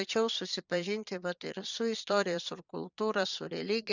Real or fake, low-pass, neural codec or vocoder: fake; 7.2 kHz; vocoder, 22.05 kHz, 80 mel bands, Vocos